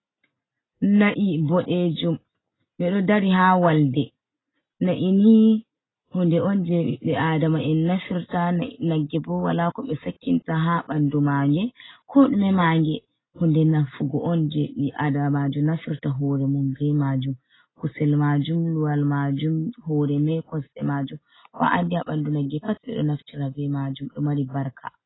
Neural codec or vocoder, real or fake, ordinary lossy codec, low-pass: none; real; AAC, 16 kbps; 7.2 kHz